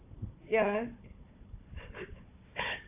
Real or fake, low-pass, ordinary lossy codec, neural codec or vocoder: fake; 3.6 kHz; AAC, 32 kbps; codec, 16 kHz, 4 kbps, FunCodec, trained on Chinese and English, 50 frames a second